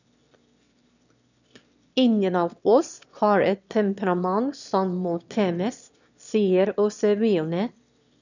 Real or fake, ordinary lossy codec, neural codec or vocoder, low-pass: fake; none; autoencoder, 22.05 kHz, a latent of 192 numbers a frame, VITS, trained on one speaker; 7.2 kHz